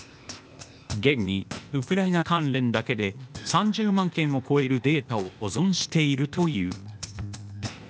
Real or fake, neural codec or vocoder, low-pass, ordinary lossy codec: fake; codec, 16 kHz, 0.8 kbps, ZipCodec; none; none